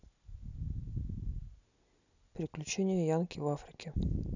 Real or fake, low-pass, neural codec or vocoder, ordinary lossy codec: real; 7.2 kHz; none; none